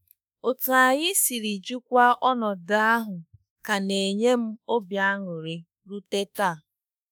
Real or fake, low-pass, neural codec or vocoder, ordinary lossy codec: fake; none; autoencoder, 48 kHz, 32 numbers a frame, DAC-VAE, trained on Japanese speech; none